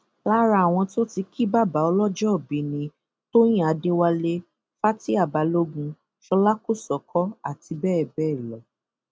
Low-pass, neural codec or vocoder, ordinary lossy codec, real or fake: none; none; none; real